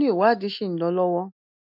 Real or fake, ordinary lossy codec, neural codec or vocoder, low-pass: real; none; none; 5.4 kHz